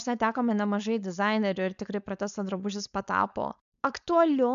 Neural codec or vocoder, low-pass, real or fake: codec, 16 kHz, 4.8 kbps, FACodec; 7.2 kHz; fake